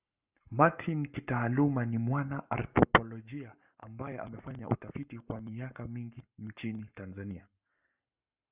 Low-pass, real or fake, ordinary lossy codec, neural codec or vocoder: 3.6 kHz; fake; Opus, 64 kbps; codec, 44.1 kHz, 7.8 kbps, Pupu-Codec